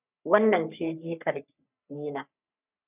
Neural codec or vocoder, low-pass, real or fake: codec, 44.1 kHz, 3.4 kbps, Pupu-Codec; 3.6 kHz; fake